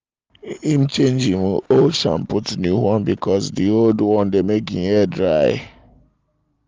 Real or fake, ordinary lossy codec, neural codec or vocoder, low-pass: real; Opus, 32 kbps; none; 7.2 kHz